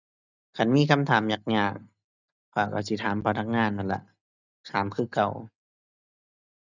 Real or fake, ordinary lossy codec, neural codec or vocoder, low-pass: real; none; none; 7.2 kHz